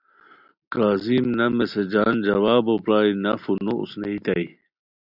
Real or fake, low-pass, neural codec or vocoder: real; 5.4 kHz; none